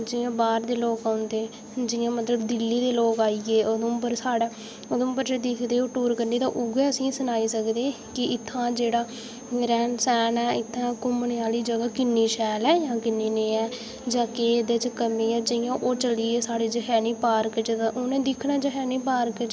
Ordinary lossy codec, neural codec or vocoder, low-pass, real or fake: none; none; none; real